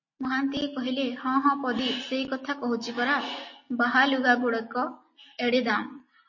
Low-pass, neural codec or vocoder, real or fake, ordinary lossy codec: 7.2 kHz; none; real; MP3, 32 kbps